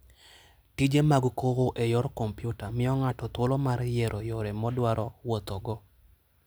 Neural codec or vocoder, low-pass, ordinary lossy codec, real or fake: none; none; none; real